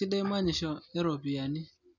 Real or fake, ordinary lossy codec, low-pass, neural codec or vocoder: real; none; 7.2 kHz; none